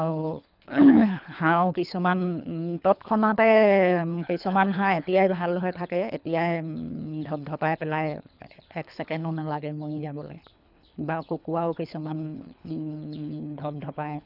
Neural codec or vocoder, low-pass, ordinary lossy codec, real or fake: codec, 24 kHz, 3 kbps, HILCodec; 5.4 kHz; none; fake